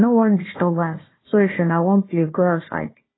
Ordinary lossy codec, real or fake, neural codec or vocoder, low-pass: AAC, 16 kbps; fake; codec, 16 kHz, 1 kbps, FunCodec, trained on Chinese and English, 50 frames a second; 7.2 kHz